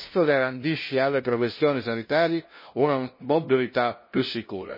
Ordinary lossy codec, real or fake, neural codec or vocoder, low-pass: MP3, 24 kbps; fake; codec, 16 kHz, 0.5 kbps, FunCodec, trained on LibriTTS, 25 frames a second; 5.4 kHz